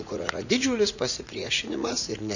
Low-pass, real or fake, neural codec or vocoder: 7.2 kHz; fake; vocoder, 44.1 kHz, 80 mel bands, Vocos